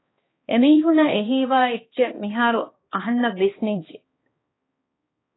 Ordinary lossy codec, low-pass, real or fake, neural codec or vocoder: AAC, 16 kbps; 7.2 kHz; fake; codec, 16 kHz, 2 kbps, X-Codec, HuBERT features, trained on balanced general audio